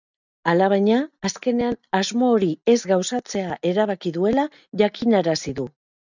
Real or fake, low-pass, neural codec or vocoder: real; 7.2 kHz; none